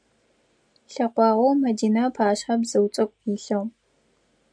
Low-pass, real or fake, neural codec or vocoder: 9.9 kHz; real; none